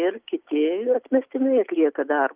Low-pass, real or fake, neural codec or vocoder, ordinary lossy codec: 3.6 kHz; real; none; Opus, 32 kbps